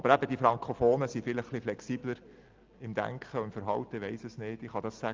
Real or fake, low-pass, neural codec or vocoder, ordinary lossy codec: real; 7.2 kHz; none; Opus, 32 kbps